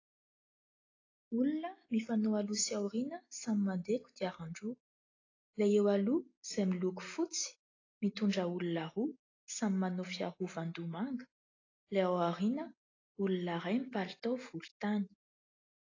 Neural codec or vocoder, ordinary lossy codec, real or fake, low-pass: none; AAC, 32 kbps; real; 7.2 kHz